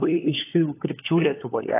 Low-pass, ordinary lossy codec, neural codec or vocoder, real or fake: 3.6 kHz; AAC, 16 kbps; codec, 16 kHz, 4 kbps, FunCodec, trained on LibriTTS, 50 frames a second; fake